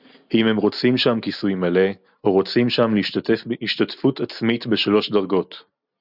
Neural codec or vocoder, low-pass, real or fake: none; 5.4 kHz; real